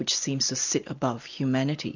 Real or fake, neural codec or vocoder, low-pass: real; none; 7.2 kHz